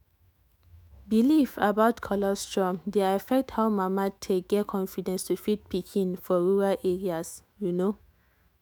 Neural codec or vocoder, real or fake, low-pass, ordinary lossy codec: autoencoder, 48 kHz, 128 numbers a frame, DAC-VAE, trained on Japanese speech; fake; none; none